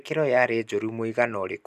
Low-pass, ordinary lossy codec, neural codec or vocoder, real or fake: 14.4 kHz; none; none; real